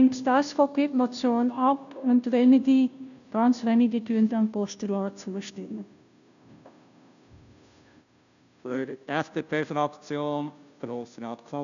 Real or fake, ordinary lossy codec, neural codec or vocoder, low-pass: fake; none; codec, 16 kHz, 0.5 kbps, FunCodec, trained on Chinese and English, 25 frames a second; 7.2 kHz